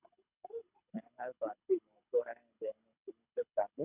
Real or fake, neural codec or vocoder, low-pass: fake; codec, 24 kHz, 6 kbps, HILCodec; 3.6 kHz